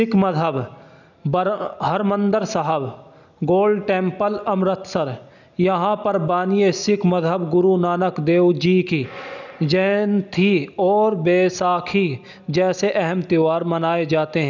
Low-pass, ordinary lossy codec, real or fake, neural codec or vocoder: 7.2 kHz; none; real; none